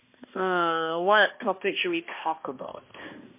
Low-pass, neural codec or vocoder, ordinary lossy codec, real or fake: 3.6 kHz; codec, 16 kHz, 1 kbps, X-Codec, HuBERT features, trained on balanced general audio; MP3, 24 kbps; fake